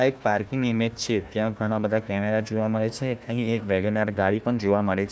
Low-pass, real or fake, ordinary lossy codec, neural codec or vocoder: none; fake; none; codec, 16 kHz, 1 kbps, FunCodec, trained on Chinese and English, 50 frames a second